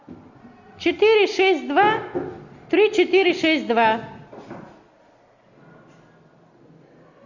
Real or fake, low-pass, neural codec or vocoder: real; 7.2 kHz; none